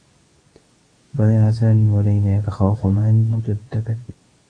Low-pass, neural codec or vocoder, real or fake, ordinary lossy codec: 9.9 kHz; codec, 24 kHz, 0.9 kbps, WavTokenizer, medium speech release version 2; fake; AAC, 32 kbps